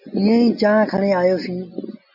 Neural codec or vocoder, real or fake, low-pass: none; real; 5.4 kHz